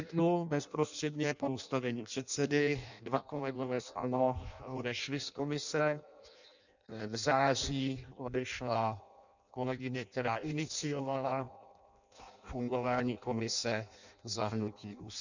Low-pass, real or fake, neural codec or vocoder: 7.2 kHz; fake; codec, 16 kHz in and 24 kHz out, 0.6 kbps, FireRedTTS-2 codec